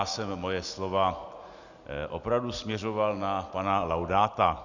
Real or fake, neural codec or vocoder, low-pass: real; none; 7.2 kHz